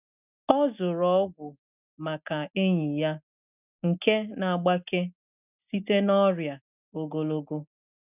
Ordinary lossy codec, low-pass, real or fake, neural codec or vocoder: none; 3.6 kHz; real; none